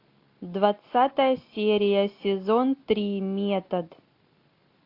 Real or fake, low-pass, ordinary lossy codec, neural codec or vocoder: real; 5.4 kHz; AAC, 32 kbps; none